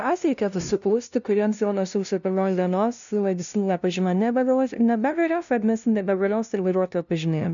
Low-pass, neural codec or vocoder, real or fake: 7.2 kHz; codec, 16 kHz, 0.5 kbps, FunCodec, trained on LibriTTS, 25 frames a second; fake